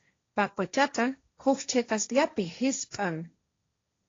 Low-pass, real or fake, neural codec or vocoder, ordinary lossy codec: 7.2 kHz; fake; codec, 16 kHz, 1.1 kbps, Voila-Tokenizer; AAC, 32 kbps